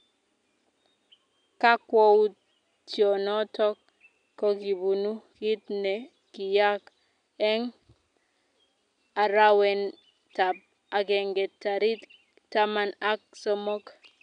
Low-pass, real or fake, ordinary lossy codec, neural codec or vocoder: 9.9 kHz; real; none; none